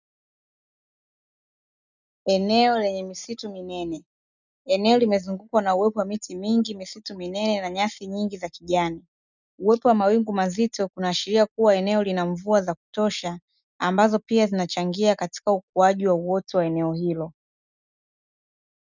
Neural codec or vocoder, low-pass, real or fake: none; 7.2 kHz; real